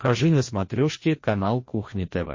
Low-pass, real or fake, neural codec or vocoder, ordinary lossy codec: 7.2 kHz; fake; codec, 16 kHz, 1 kbps, FreqCodec, larger model; MP3, 32 kbps